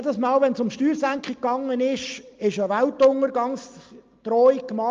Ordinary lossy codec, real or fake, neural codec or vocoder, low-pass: Opus, 32 kbps; real; none; 7.2 kHz